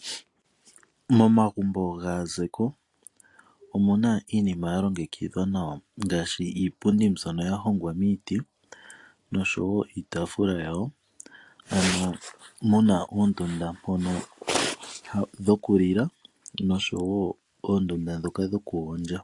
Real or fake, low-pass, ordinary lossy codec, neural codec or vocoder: real; 10.8 kHz; AAC, 48 kbps; none